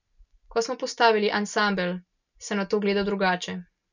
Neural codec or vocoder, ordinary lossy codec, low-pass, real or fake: none; none; 7.2 kHz; real